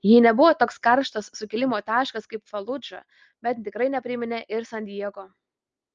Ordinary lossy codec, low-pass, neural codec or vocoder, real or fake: Opus, 24 kbps; 7.2 kHz; none; real